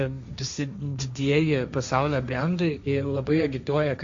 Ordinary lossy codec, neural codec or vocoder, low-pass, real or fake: Opus, 64 kbps; codec, 16 kHz, 1.1 kbps, Voila-Tokenizer; 7.2 kHz; fake